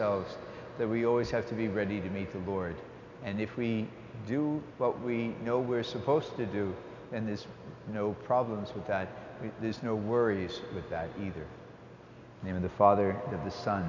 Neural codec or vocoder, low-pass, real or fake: none; 7.2 kHz; real